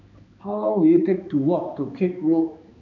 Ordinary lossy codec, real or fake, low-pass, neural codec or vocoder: none; fake; 7.2 kHz; codec, 16 kHz, 2 kbps, X-Codec, HuBERT features, trained on balanced general audio